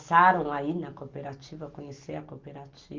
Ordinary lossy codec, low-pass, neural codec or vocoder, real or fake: Opus, 24 kbps; 7.2 kHz; none; real